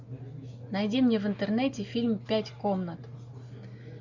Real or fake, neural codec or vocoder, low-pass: real; none; 7.2 kHz